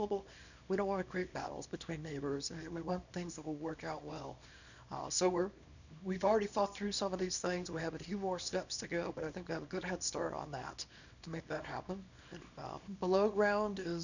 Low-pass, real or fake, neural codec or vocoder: 7.2 kHz; fake; codec, 24 kHz, 0.9 kbps, WavTokenizer, small release